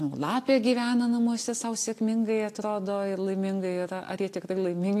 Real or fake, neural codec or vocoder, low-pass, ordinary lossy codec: real; none; 14.4 kHz; AAC, 64 kbps